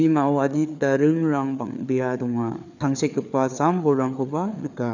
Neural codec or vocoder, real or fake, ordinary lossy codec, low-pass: codec, 16 kHz, 4 kbps, FreqCodec, larger model; fake; none; 7.2 kHz